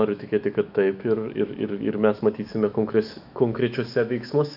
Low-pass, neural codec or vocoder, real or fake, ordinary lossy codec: 5.4 kHz; autoencoder, 48 kHz, 128 numbers a frame, DAC-VAE, trained on Japanese speech; fake; AAC, 48 kbps